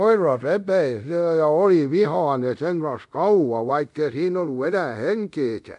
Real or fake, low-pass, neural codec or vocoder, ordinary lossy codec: fake; 10.8 kHz; codec, 24 kHz, 0.5 kbps, DualCodec; none